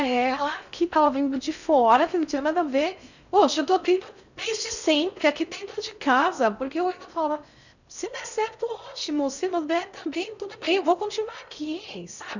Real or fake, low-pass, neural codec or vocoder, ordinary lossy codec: fake; 7.2 kHz; codec, 16 kHz in and 24 kHz out, 0.6 kbps, FocalCodec, streaming, 4096 codes; none